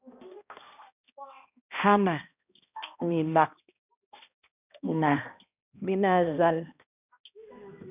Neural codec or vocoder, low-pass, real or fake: codec, 16 kHz, 1 kbps, X-Codec, HuBERT features, trained on balanced general audio; 3.6 kHz; fake